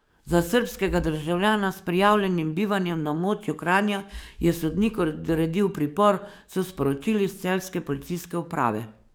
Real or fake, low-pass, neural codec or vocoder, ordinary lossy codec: fake; none; codec, 44.1 kHz, 7.8 kbps, DAC; none